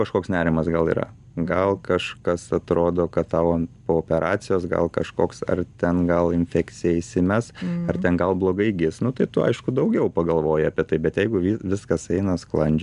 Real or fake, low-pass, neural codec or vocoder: real; 10.8 kHz; none